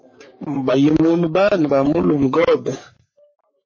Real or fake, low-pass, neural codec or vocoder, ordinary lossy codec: fake; 7.2 kHz; codec, 44.1 kHz, 3.4 kbps, Pupu-Codec; MP3, 32 kbps